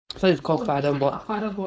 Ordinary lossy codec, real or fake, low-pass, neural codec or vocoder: none; fake; none; codec, 16 kHz, 4.8 kbps, FACodec